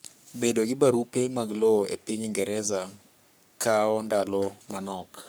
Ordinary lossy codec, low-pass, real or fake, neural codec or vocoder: none; none; fake; codec, 44.1 kHz, 3.4 kbps, Pupu-Codec